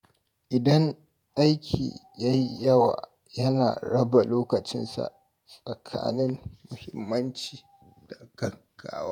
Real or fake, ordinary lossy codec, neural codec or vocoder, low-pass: fake; none; vocoder, 48 kHz, 128 mel bands, Vocos; 19.8 kHz